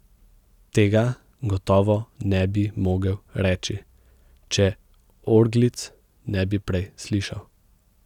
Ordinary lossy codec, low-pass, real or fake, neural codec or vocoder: none; 19.8 kHz; real; none